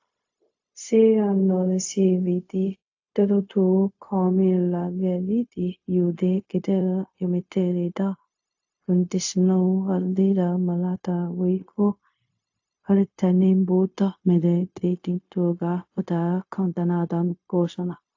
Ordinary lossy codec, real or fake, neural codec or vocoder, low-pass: AAC, 48 kbps; fake; codec, 16 kHz, 0.4 kbps, LongCat-Audio-Codec; 7.2 kHz